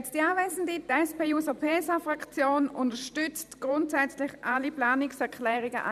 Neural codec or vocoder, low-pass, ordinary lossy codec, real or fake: vocoder, 44.1 kHz, 128 mel bands every 512 samples, BigVGAN v2; 14.4 kHz; none; fake